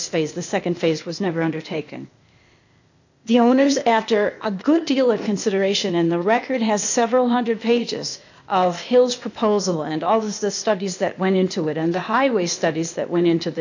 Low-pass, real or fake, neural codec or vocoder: 7.2 kHz; fake; codec, 16 kHz, 0.8 kbps, ZipCodec